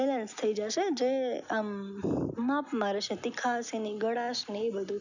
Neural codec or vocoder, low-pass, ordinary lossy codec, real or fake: none; 7.2 kHz; none; real